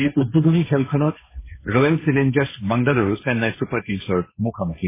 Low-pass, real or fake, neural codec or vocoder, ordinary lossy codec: 3.6 kHz; fake; codec, 16 kHz, 1.1 kbps, Voila-Tokenizer; MP3, 16 kbps